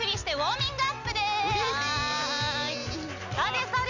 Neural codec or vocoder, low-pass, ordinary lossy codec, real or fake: none; 7.2 kHz; none; real